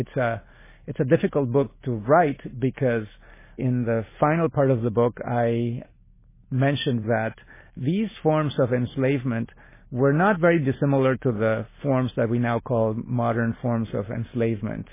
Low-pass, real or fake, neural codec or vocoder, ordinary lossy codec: 3.6 kHz; real; none; MP3, 16 kbps